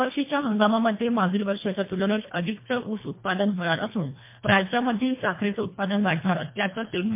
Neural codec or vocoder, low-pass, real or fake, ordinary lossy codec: codec, 24 kHz, 1.5 kbps, HILCodec; 3.6 kHz; fake; MP3, 24 kbps